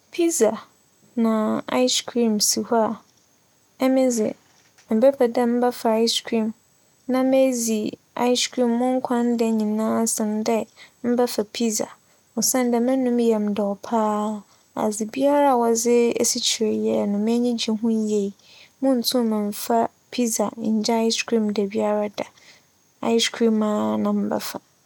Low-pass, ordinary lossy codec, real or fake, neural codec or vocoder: 19.8 kHz; none; real; none